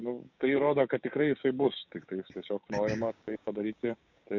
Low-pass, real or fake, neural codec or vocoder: 7.2 kHz; fake; codec, 16 kHz, 6 kbps, DAC